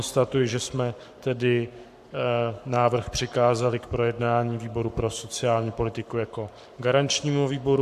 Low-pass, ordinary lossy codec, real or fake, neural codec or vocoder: 14.4 kHz; MP3, 96 kbps; fake; codec, 44.1 kHz, 7.8 kbps, Pupu-Codec